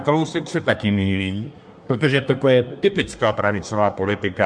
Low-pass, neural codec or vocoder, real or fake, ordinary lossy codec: 9.9 kHz; codec, 24 kHz, 1 kbps, SNAC; fake; MP3, 64 kbps